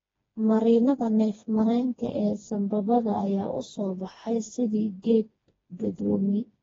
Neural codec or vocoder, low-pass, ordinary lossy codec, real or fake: codec, 16 kHz, 2 kbps, FreqCodec, smaller model; 7.2 kHz; AAC, 24 kbps; fake